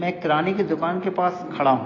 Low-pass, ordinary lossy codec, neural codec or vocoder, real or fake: 7.2 kHz; AAC, 32 kbps; none; real